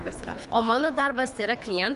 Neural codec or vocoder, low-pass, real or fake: codec, 24 kHz, 3 kbps, HILCodec; 10.8 kHz; fake